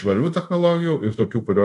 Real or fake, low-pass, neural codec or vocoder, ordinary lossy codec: fake; 10.8 kHz; codec, 24 kHz, 0.5 kbps, DualCodec; AAC, 64 kbps